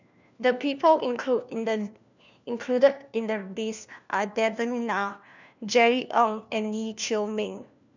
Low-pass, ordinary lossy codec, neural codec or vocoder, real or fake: 7.2 kHz; none; codec, 16 kHz, 1 kbps, FunCodec, trained on LibriTTS, 50 frames a second; fake